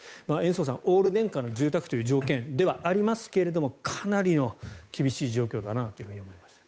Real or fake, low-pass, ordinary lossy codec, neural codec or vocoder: fake; none; none; codec, 16 kHz, 8 kbps, FunCodec, trained on Chinese and English, 25 frames a second